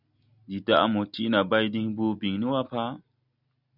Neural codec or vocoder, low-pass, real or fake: none; 5.4 kHz; real